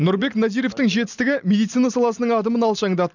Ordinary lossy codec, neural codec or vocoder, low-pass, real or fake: none; none; 7.2 kHz; real